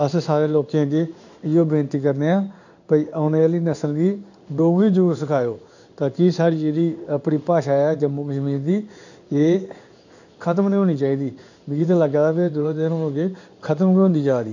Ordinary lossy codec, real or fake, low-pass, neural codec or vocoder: none; fake; 7.2 kHz; codec, 16 kHz in and 24 kHz out, 1 kbps, XY-Tokenizer